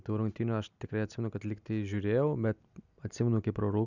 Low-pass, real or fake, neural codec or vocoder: 7.2 kHz; real; none